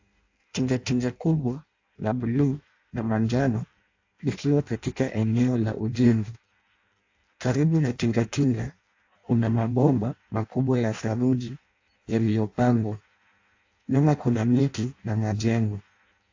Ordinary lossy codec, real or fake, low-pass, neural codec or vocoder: AAC, 48 kbps; fake; 7.2 kHz; codec, 16 kHz in and 24 kHz out, 0.6 kbps, FireRedTTS-2 codec